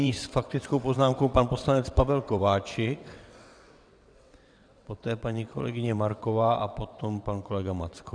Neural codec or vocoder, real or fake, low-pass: vocoder, 22.05 kHz, 80 mel bands, WaveNeXt; fake; 9.9 kHz